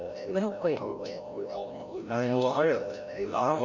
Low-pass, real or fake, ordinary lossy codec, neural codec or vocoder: 7.2 kHz; fake; none; codec, 16 kHz, 0.5 kbps, FreqCodec, larger model